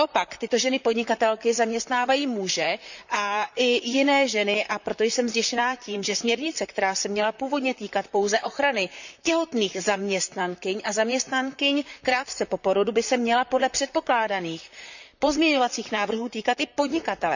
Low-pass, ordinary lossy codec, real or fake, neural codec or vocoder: 7.2 kHz; none; fake; vocoder, 44.1 kHz, 128 mel bands, Pupu-Vocoder